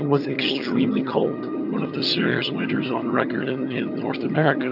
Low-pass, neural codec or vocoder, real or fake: 5.4 kHz; vocoder, 22.05 kHz, 80 mel bands, HiFi-GAN; fake